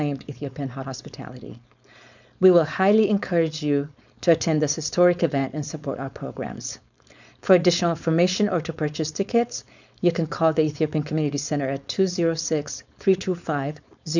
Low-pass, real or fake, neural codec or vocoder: 7.2 kHz; fake; codec, 16 kHz, 4.8 kbps, FACodec